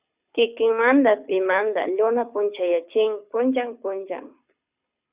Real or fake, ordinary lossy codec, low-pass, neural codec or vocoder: fake; Opus, 64 kbps; 3.6 kHz; codec, 24 kHz, 6 kbps, HILCodec